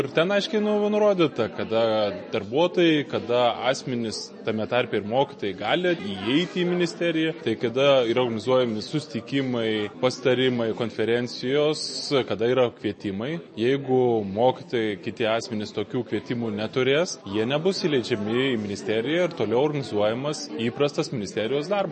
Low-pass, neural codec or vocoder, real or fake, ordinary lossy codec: 10.8 kHz; none; real; MP3, 32 kbps